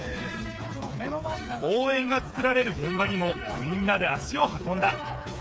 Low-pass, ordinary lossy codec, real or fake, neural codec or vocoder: none; none; fake; codec, 16 kHz, 4 kbps, FreqCodec, smaller model